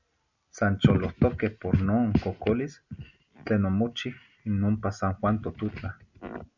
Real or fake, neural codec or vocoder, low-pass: real; none; 7.2 kHz